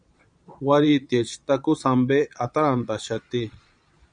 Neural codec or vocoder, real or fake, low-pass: vocoder, 22.05 kHz, 80 mel bands, Vocos; fake; 9.9 kHz